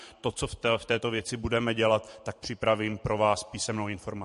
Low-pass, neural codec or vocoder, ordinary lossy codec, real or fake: 14.4 kHz; vocoder, 44.1 kHz, 128 mel bands every 512 samples, BigVGAN v2; MP3, 48 kbps; fake